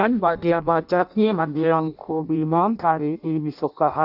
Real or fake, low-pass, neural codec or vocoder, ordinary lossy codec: fake; 5.4 kHz; codec, 16 kHz in and 24 kHz out, 0.6 kbps, FireRedTTS-2 codec; none